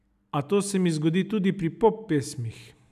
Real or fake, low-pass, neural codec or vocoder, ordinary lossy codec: real; 14.4 kHz; none; none